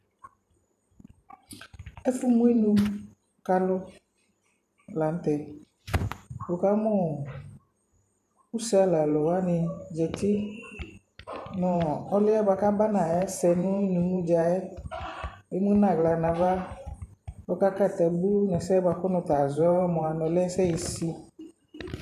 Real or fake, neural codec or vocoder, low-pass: fake; vocoder, 44.1 kHz, 128 mel bands every 512 samples, BigVGAN v2; 14.4 kHz